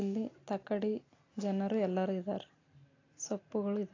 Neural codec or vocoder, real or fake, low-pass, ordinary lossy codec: none; real; 7.2 kHz; AAC, 32 kbps